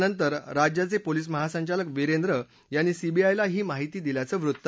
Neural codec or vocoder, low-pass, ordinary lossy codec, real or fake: none; none; none; real